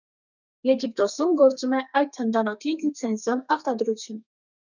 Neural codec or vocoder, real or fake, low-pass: codec, 32 kHz, 1.9 kbps, SNAC; fake; 7.2 kHz